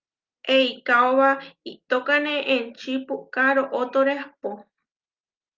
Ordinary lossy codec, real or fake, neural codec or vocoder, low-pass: Opus, 24 kbps; real; none; 7.2 kHz